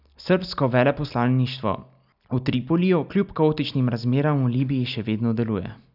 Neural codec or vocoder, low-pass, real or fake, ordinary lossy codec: none; 5.4 kHz; real; none